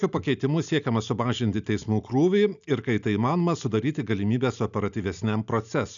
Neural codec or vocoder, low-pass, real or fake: none; 7.2 kHz; real